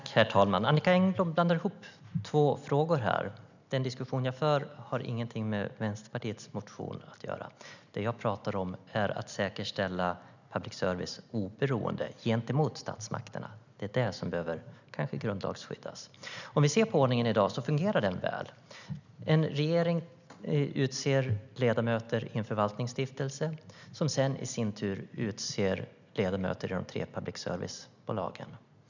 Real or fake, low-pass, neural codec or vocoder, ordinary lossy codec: real; 7.2 kHz; none; none